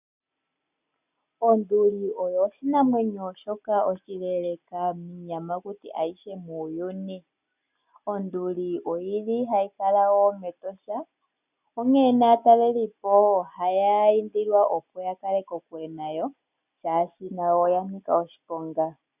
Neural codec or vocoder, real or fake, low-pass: none; real; 3.6 kHz